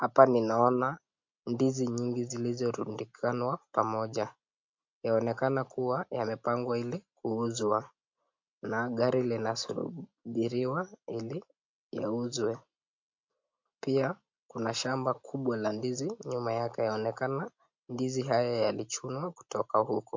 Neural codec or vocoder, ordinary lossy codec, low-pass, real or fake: none; MP3, 48 kbps; 7.2 kHz; real